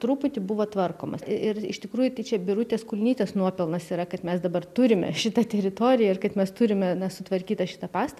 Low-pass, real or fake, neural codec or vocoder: 14.4 kHz; real; none